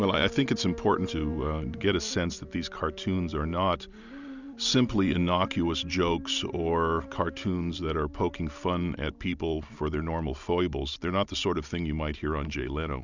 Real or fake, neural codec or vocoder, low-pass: real; none; 7.2 kHz